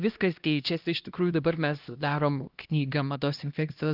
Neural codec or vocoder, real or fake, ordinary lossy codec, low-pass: codec, 16 kHz, 1 kbps, X-Codec, HuBERT features, trained on LibriSpeech; fake; Opus, 32 kbps; 5.4 kHz